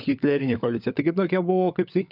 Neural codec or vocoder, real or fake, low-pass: codec, 16 kHz, 16 kbps, FunCodec, trained on LibriTTS, 50 frames a second; fake; 5.4 kHz